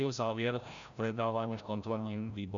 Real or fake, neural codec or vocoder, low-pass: fake; codec, 16 kHz, 0.5 kbps, FreqCodec, larger model; 7.2 kHz